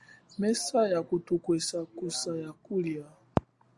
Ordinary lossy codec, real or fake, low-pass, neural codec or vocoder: Opus, 64 kbps; real; 10.8 kHz; none